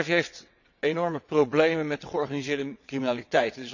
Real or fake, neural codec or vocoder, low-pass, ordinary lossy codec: fake; vocoder, 22.05 kHz, 80 mel bands, WaveNeXt; 7.2 kHz; none